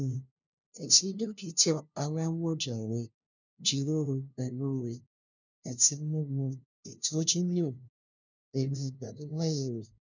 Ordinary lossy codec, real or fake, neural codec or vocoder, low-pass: none; fake; codec, 16 kHz, 0.5 kbps, FunCodec, trained on LibriTTS, 25 frames a second; 7.2 kHz